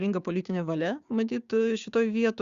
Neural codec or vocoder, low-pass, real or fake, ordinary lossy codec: codec, 16 kHz, 6 kbps, DAC; 7.2 kHz; fake; Opus, 64 kbps